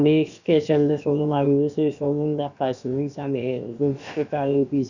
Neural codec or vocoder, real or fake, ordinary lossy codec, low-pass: codec, 16 kHz, about 1 kbps, DyCAST, with the encoder's durations; fake; none; 7.2 kHz